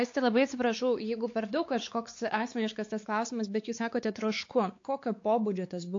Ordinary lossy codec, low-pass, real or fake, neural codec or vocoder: AAC, 48 kbps; 7.2 kHz; fake; codec, 16 kHz, 4 kbps, X-Codec, WavLM features, trained on Multilingual LibriSpeech